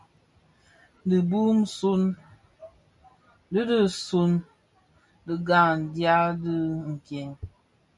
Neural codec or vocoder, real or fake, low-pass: none; real; 10.8 kHz